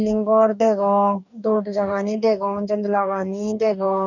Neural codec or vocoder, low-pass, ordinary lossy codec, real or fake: codec, 44.1 kHz, 2.6 kbps, DAC; 7.2 kHz; none; fake